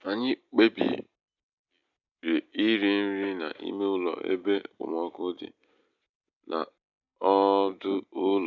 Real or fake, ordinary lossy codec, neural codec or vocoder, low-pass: real; none; none; 7.2 kHz